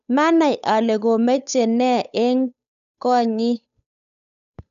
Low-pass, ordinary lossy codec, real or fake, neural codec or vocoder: 7.2 kHz; none; fake; codec, 16 kHz, 8 kbps, FunCodec, trained on Chinese and English, 25 frames a second